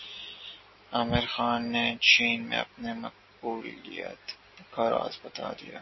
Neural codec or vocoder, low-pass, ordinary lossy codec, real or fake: none; 7.2 kHz; MP3, 24 kbps; real